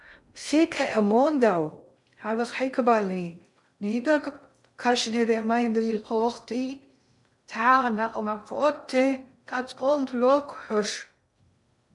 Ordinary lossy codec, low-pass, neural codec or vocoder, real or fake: MP3, 96 kbps; 10.8 kHz; codec, 16 kHz in and 24 kHz out, 0.6 kbps, FocalCodec, streaming, 2048 codes; fake